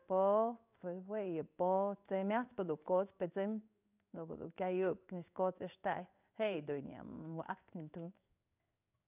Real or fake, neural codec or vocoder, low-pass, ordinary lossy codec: fake; codec, 16 kHz in and 24 kHz out, 1 kbps, XY-Tokenizer; 3.6 kHz; none